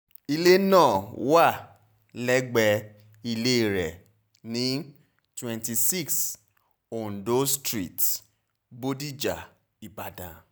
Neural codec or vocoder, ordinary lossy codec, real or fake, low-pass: none; none; real; none